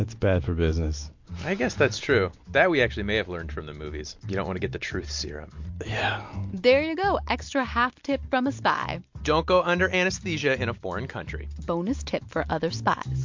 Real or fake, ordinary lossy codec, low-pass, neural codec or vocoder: real; MP3, 48 kbps; 7.2 kHz; none